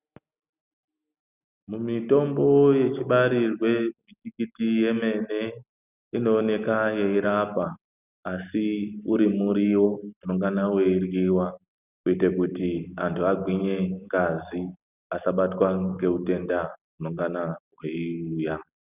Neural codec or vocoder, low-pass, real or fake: none; 3.6 kHz; real